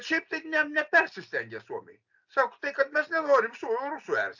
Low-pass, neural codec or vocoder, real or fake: 7.2 kHz; none; real